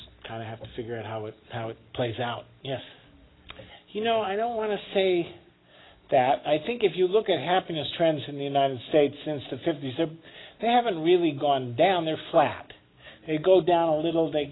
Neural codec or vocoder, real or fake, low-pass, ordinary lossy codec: none; real; 7.2 kHz; AAC, 16 kbps